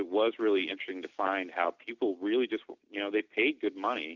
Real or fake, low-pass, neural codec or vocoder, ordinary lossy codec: real; 7.2 kHz; none; Opus, 64 kbps